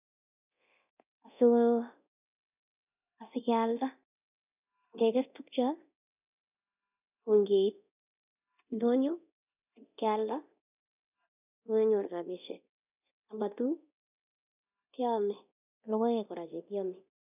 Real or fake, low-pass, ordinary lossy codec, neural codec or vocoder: fake; 3.6 kHz; none; codec, 24 kHz, 0.9 kbps, DualCodec